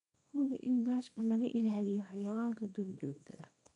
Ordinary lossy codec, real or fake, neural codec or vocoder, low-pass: none; fake; codec, 24 kHz, 0.9 kbps, WavTokenizer, small release; 10.8 kHz